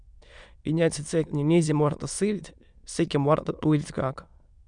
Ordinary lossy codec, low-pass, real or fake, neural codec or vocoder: Opus, 64 kbps; 9.9 kHz; fake; autoencoder, 22.05 kHz, a latent of 192 numbers a frame, VITS, trained on many speakers